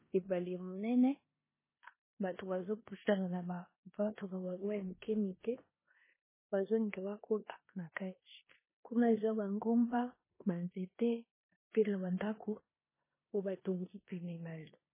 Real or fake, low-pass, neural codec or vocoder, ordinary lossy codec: fake; 3.6 kHz; codec, 16 kHz in and 24 kHz out, 0.9 kbps, LongCat-Audio-Codec, four codebook decoder; MP3, 16 kbps